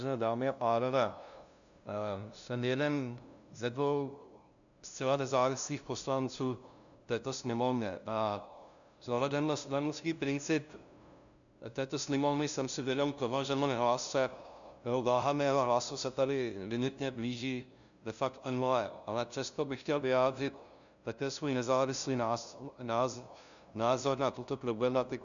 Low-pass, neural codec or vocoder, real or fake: 7.2 kHz; codec, 16 kHz, 0.5 kbps, FunCodec, trained on LibriTTS, 25 frames a second; fake